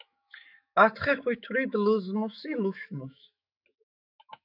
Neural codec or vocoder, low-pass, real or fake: autoencoder, 48 kHz, 128 numbers a frame, DAC-VAE, trained on Japanese speech; 5.4 kHz; fake